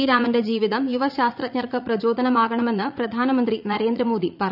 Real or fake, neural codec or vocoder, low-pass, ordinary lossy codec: fake; vocoder, 44.1 kHz, 80 mel bands, Vocos; 5.4 kHz; none